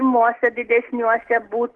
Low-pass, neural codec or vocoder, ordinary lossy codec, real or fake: 10.8 kHz; none; Opus, 16 kbps; real